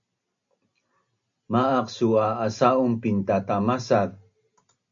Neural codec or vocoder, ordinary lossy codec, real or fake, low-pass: none; AAC, 64 kbps; real; 7.2 kHz